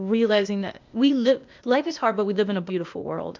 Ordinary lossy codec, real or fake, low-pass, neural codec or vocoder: MP3, 64 kbps; fake; 7.2 kHz; codec, 16 kHz, 0.8 kbps, ZipCodec